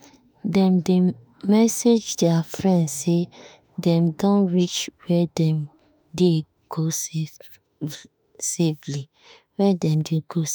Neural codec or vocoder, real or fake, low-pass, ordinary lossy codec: autoencoder, 48 kHz, 32 numbers a frame, DAC-VAE, trained on Japanese speech; fake; none; none